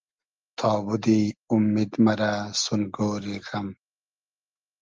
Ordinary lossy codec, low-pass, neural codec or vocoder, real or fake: Opus, 24 kbps; 7.2 kHz; none; real